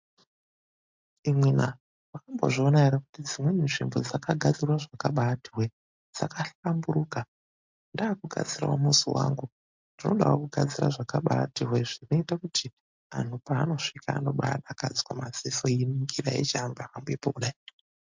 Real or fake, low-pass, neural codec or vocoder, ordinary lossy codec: real; 7.2 kHz; none; MP3, 64 kbps